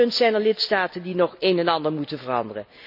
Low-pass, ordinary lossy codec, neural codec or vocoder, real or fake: 5.4 kHz; none; none; real